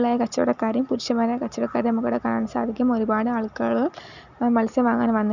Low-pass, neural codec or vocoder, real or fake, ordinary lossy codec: 7.2 kHz; none; real; none